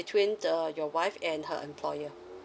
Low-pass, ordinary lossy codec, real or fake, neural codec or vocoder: none; none; real; none